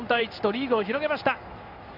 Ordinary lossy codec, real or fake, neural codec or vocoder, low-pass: none; fake; codec, 16 kHz in and 24 kHz out, 1 kbps, XY-Tokenizer; 5.4 kHz